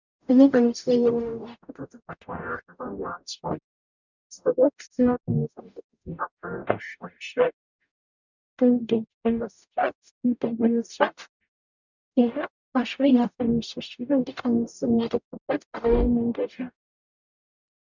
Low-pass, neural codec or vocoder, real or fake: 7.2 kHz; codec, 44.1 kHz, 0.9 kbps, DAC; fake